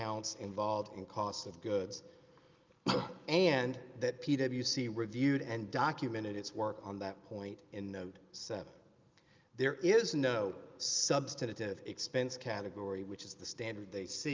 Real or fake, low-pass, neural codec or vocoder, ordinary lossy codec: real; 7.2 kHz; none; Opus, 24 kbps